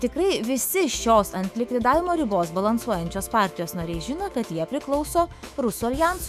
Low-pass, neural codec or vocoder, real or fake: 14.4 kHz; autoencoder, 48 kHz, 128 numbers a frame, DAC-VAE, trained on Japanese speech; fake